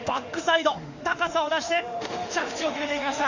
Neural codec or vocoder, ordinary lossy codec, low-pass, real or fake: autoencoder, 48 kHz, 32 numbers a frame, DAC-VAE, trained on Japanese speech; none; 7.2 kHz; fake